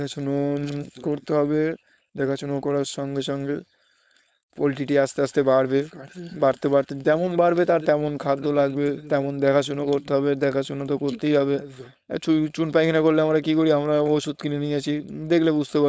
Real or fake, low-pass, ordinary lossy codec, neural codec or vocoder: fake; none; none; codec, 16 kHz, 4.8 kbps, FACodec